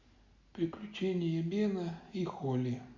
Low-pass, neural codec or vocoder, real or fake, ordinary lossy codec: 7.2 kHz; none; real; Opus, 64 kbps